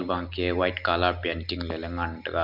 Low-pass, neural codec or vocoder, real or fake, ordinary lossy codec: 5.4 kHz; none; real; none